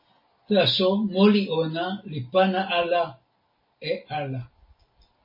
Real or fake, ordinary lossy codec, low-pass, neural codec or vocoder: real; MP3, 24 kbps; 5.4 kHz; none